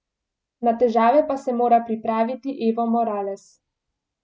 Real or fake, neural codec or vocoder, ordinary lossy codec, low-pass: real; none; none; none